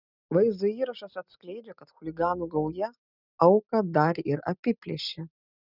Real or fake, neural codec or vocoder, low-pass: real; none; 5.4 kHz